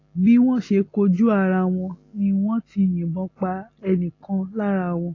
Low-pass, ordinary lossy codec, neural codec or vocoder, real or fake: 7.2 kHz; AAC, 32 kbps; none; real